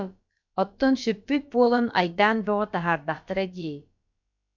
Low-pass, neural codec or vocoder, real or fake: 7.2 kHz; codec, 16 kHz, about 1 kbps, DyCAST, with the encoder's durations; fake